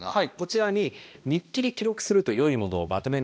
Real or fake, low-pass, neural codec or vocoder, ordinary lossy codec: fake; none; codec, 16 kHz, 1 kbps, X-Codec, HuBERT features, trained on balanced general audio; none